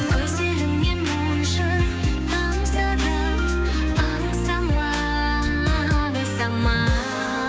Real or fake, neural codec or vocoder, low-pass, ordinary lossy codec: fake; codec, 16 kHz, 6 kbps, DAC; none; none